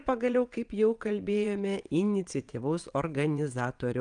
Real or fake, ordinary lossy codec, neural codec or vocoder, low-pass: fake; Opus, 32 kbps; vocoder, 22.05 kHz, 80 mel bands, WaveNeXt; 9.9 kHz